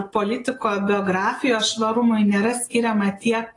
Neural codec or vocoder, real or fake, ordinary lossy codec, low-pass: none; real; AAC, 32 kbps; 10.8 kHz